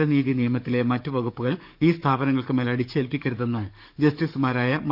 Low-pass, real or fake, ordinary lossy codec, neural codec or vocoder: 5.4 kHz; fake; none; codec, 44.1 kHz, 7.8 kbps, DAC